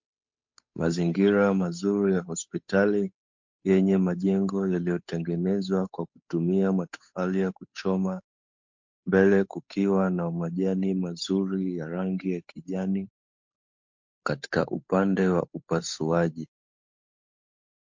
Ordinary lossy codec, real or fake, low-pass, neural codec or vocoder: MP3, 48 kbps; fake; 7.2 kHz; codec, 16 kHz, 8 kbps, FunCodec, trained on Chinese and English, 25 frames a second